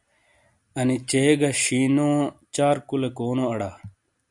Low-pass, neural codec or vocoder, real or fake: 10.8 kHz; none; real